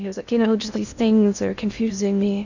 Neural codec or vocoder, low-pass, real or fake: codec, 16 kHz in and 24 kHz out, 0.6 kbps, FocalCodec, streaming, 2048 codes; 7.2 kHz; fake